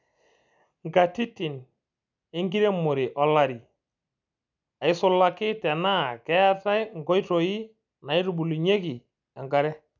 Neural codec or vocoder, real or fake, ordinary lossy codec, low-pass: none; real; none; 7.2 kHz